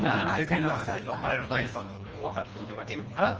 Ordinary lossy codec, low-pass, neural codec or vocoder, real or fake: Opus, 24 kbps; 7.2 kHz; codec, 24 kHz, 1.5 kbps, HILCodec; fake